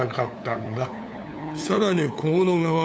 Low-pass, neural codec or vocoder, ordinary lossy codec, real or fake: none; codec, 16 kHz, 8 kbps, FunCodec, trained on LibriTTS, 25 frames a second; none; fake